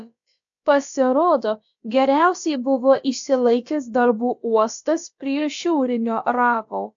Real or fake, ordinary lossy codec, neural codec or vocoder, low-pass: fake; AAC, 64 kbps; codec, 16 kHz, about 1 kbps, DyCAST, with the encoder's durations; 7.2 kHz